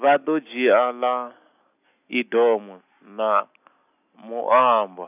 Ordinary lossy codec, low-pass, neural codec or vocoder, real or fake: none; 3.6 kHz; none; real